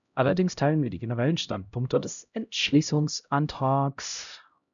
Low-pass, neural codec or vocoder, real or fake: 7.2 kHz; codec, 16 kHz, 0.5 kbps, X-Codec, HuBERT features, trained on LibriSpeech; fake